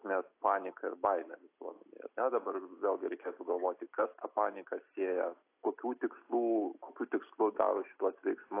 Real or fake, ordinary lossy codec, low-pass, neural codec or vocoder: real; AAC, 24 kbps; 3.6 kHz; none